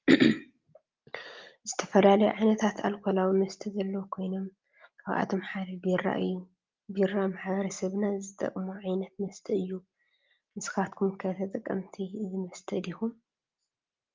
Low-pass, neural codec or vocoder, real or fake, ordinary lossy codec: 7.2 kHz; none; real; Opus, 32 kbps